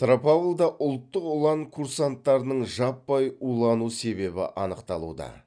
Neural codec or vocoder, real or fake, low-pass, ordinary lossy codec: none; real; 9.9 kHz; none